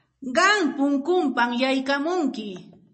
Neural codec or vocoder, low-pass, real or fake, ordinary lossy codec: none; 10.8 kHz; real; MP3, 32 kbps